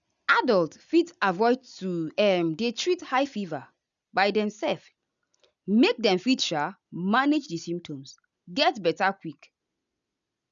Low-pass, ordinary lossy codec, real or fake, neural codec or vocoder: 7.2 kHz; none; real; none